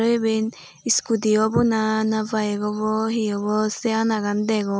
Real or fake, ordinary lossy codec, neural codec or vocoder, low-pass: real; none; none; none